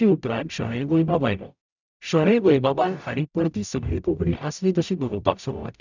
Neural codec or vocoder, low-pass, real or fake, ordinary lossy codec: codec, 44.1 kHz, 0.9 kbps, DAC; 7.2 kHz; fake; none